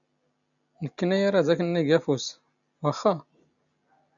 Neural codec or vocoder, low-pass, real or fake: none; 7.2 kHz; real